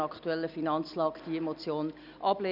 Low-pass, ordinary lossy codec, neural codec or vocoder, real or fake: 5.4 kHz; none; none; real